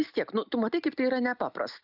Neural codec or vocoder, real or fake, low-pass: none; real; 5.4 kHz